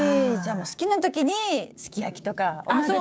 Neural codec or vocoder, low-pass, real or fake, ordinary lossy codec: codec, 16 kHz, 6 kbps, DAC; none; fake; none